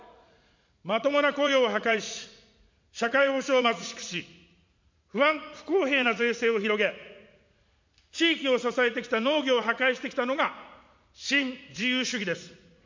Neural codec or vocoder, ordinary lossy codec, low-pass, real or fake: vocoder, 44.1 kHz, 80 mel bands, Vocos; MP3, 64 kbps; 7.2 kHz; fake